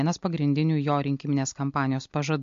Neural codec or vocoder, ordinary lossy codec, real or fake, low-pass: none; MP3, 48 kbps; real; 7.2 kHz